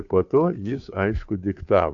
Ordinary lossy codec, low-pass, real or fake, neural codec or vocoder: AAC, 64 kbps; 7.2 kHz; fake; codec, 16 kHz, 2 kbps, FunCodec, trained on Chinese and English, 25 frames a second